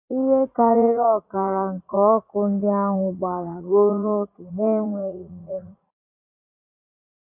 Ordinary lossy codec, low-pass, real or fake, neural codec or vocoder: AAC, 32 kbps; 3.6 kHz; fake; vocoder, 44.1 kHz, 80 mel bands, Vocos